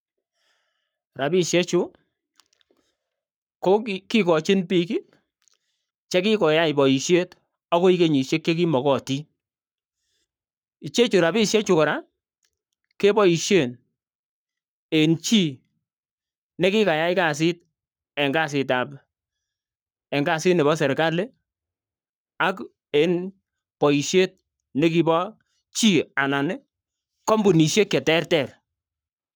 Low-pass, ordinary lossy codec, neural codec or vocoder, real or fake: none; none; codec, 44.1 kHz, 7.8 kbps, Pupu-Codec; fake